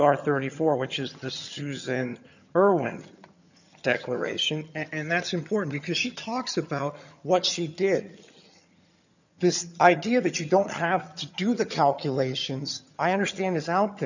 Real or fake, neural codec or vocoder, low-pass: fake; vocoder, 22.05 kHz, 80 mel bands, HiFi-GAN; 7.2 kHz